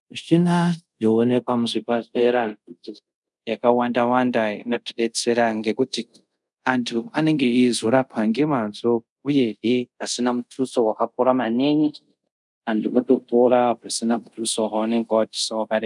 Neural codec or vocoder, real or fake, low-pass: codec, 24 kHz, 0.5 kbps, DualCodec; fake; 10.8 kHz